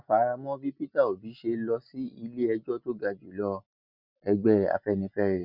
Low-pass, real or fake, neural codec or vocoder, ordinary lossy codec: 5.4 kHz; real; none; none